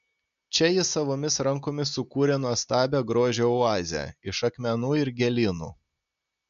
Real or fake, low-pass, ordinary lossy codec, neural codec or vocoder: real; 7.2 kHz; MP3, 64 kbps; none